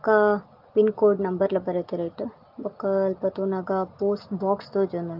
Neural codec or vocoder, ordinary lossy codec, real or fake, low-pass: none; Opus, 32 kbps; real; 5.4 kHz